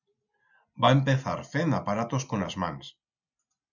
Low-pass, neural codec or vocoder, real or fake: 7.2 kHz; none; real